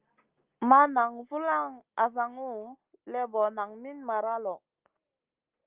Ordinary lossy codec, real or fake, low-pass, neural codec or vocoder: Opus, 32 kbps; real; 3.6 kHz; none